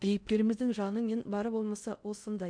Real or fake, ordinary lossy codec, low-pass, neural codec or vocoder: fake; none; 9.9 kHz; codec, 16 kHz in and 24 kHz out, 0.6 kbps, FocalCodec, streaming, 2048 codes